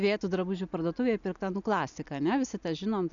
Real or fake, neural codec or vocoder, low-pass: real; none; 7.2 kHz